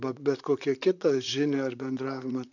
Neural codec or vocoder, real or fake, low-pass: vocoder, 44.1 kHz, 128 mel bands, Pupu-Vocoder; fake; 7.2 kHz